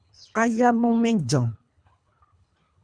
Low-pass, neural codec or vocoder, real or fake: 9.9 kHz; codec, 24 kHz, 3 kbps, HILCodec; fake